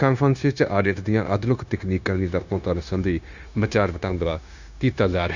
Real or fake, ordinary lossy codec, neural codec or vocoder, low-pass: fake; none; codec, 16 kHz, 0.9 kbps, LongCat-Audio-Codec; 7.2 kHz